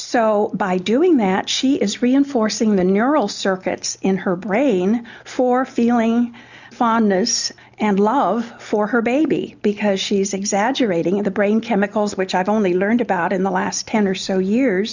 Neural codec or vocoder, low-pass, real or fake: none; 7.2 kHz; real